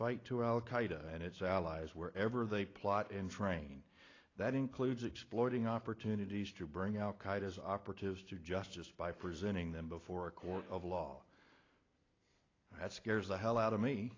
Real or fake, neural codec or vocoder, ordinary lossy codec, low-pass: real; none; AAC, 32 kbps; 7.2 kHz